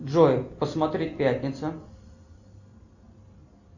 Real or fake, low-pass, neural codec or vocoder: real; 7.2 kHz; none